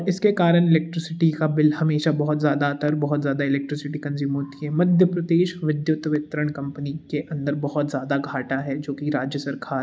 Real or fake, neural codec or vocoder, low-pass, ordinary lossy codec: real; none; none; none